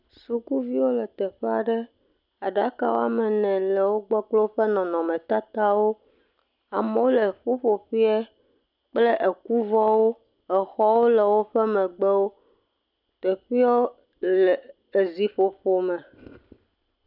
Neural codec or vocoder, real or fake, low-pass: none; real; 5.4 kHz